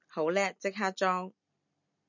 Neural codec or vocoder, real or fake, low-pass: none; real; 7.2 kHz